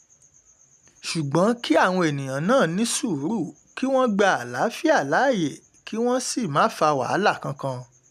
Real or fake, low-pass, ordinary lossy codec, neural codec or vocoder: real; 14.4 kHz; none; none